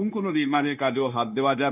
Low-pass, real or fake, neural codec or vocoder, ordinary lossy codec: 3.6 kHz; fake; codec, 16 kHz, 0.9 kbps, LongCat-Audio-Codec; none